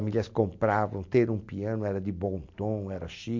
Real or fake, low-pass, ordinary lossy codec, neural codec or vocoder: real; 7.2 kHz; MP3, 48 kbps; none